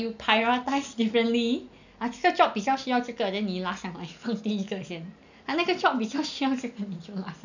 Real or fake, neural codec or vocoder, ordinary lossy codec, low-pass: real; none; none; 7.2 kHz